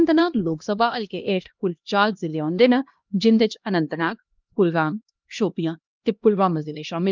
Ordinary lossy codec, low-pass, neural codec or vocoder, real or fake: Opus, 24 kbps; 7.2 kHz; codec, 16 kHz, 1 kbps, X-Codec, HuBERT features, trained on LibriSpeech; fake